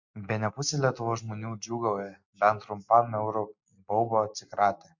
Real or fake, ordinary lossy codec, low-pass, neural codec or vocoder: real; MP3, 48 kbps; 7.2 kHz; none